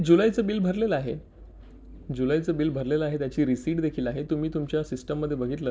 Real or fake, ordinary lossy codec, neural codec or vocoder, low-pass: real; none; none; none